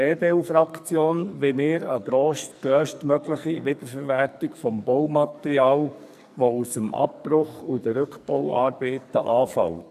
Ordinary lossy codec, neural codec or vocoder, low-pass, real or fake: none; codec, 44.1 kHz, 2.6 kbps, SNAC; 14.4 kHz; fake